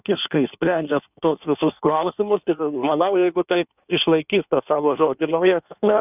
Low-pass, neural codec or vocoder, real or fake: 3.6 kHz; codec, 16 kHz in and 24 kHz out, 1.1 kbps, FireRedTTS-2 codec; fake